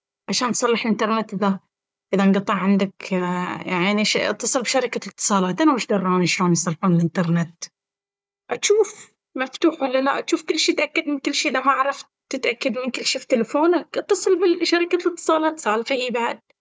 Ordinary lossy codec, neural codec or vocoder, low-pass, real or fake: none; codec, 16 kHz, 4 kbps, FunCodec, trained on Chinese and English, 50 frames a second; none; fake